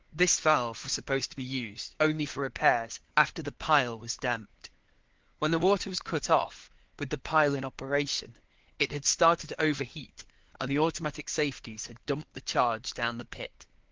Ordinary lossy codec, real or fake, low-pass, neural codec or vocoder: Opus, 16 kbps; fake; 7.2 kHz; codec, 16 kHz, 4 kbps, FunCodec, trained on LibriTTS, 50 frames a second